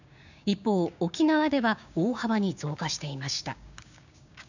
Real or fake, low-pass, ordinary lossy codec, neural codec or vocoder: fake; 7.2 kHz; none; codec, 16 kHz, 6 kbps, DAC